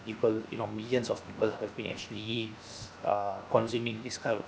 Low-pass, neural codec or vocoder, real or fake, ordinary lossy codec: none; codec, 16 kHz, 0.7 kbps, FocalCodec; fake; none